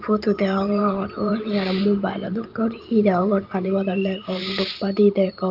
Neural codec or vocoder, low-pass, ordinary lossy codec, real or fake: vocoder, 44.1 kHz, 128 mel bands every 512 samples, BigVGAN v2; 5.4 kHz; Opus, 32 kbps; fake